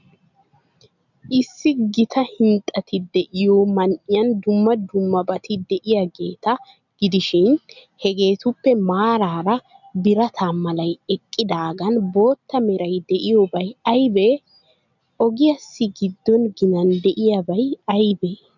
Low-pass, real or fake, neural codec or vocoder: 7.2 kHz; real; none